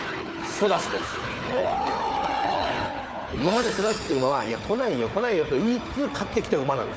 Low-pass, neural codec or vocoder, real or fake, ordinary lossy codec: none; codec, 16 kHz, 4 kbps, FunCodec, trained on Chinese and English, 50 frames a second; fake; none